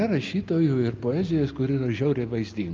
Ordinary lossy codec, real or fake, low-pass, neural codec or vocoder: Opus, 24 kbps; real; 7.2 kHz; none